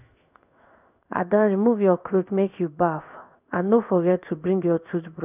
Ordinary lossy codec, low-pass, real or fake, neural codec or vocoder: none; 3.6 kHz; fake; codec, 16 kHz in and 24 kHz out, 1 kbps, XY-Tokenizer